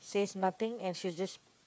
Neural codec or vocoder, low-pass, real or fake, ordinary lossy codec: codec, 16 kHz, 8 kbps, FreqCodec, smaller model; none; fake; none